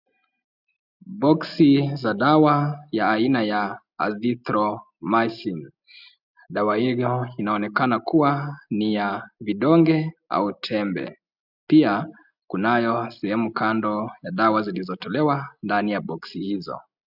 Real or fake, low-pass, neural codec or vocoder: real; 5.4 kHz; none